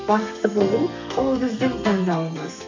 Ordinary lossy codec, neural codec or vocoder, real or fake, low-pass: MP3, 64 kbps; codec, 32 kHz, 1.9 kbps, SNAC; fake; 7.2 kHz